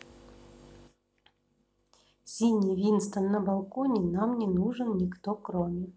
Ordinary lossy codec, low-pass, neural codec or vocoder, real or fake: none; none; none; real